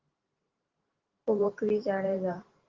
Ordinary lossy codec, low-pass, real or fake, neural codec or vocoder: Opus, 16 kbps; 7.2 kHz; fake; vocoder, 44.1 kHz, 128 mel bands every 512 samples, BigVGAN v2